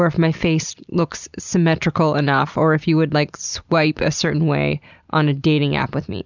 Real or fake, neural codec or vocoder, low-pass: real; none; 7.2 kHz